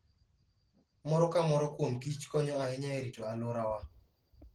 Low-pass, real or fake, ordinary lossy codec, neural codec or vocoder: 19.8 kHz; real; Opus, 16 kbps; none